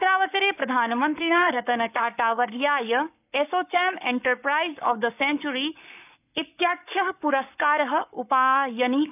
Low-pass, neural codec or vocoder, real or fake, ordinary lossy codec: 3.6 kHz; codec, 44.1 kHz, 7.8 kbps, Pupu-Codec; fake; none